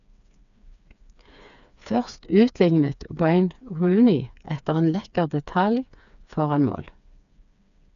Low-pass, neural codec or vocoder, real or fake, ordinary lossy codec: 7.2 kHz; codec, 16 kHz, 4 kbps, FreqCodec, smaller model; fake; none